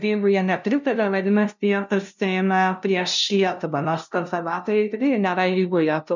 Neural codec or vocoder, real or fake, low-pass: codec, 16 kHz, 0.5 kbps, FunCodec, trained on LibriTTS, 25 frames a second; fake; 7.2 kHz